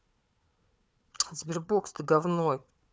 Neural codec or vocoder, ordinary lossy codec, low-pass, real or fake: codec, 16 kHz, 4 kbps, FunCodec, trained on Chinese and English, 50 frames a second; none; none; fake